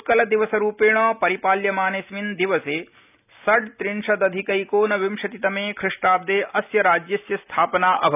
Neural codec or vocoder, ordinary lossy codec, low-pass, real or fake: none; none; 3.6 kHz; real